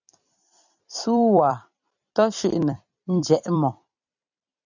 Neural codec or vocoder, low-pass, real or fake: vocoder, 44.1 kHz, 128 mel bands every 256 samples, BigVGAN v2; 7.2 kHz; fake